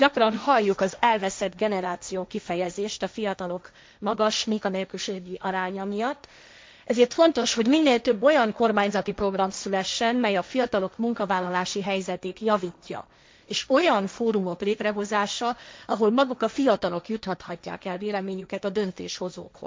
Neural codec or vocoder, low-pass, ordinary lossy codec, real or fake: codec, 16 kHz, 1.1 kbps, Voila-Tokenizer; none; none; fake